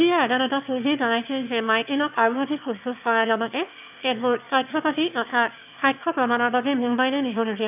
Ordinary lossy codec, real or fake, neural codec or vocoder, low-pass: none; fake; autoencoder, 22.05 kHz, a latent of 192 numbers a frame, VITS, trained on one speaker; 3.6 kHz